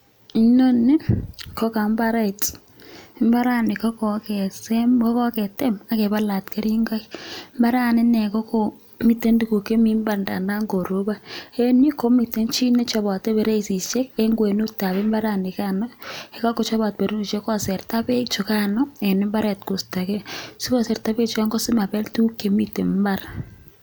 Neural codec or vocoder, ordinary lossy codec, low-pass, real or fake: none; none; none; real